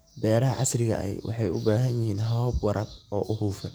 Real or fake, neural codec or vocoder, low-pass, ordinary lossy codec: fake; codec, 44.1 kHz, 7.8 kbps, DAC; none; none